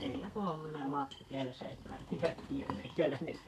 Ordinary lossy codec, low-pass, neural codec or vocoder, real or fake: none; 14.4 kHz; codec, 32 kHz, 1.9 kbps, SNAC; fake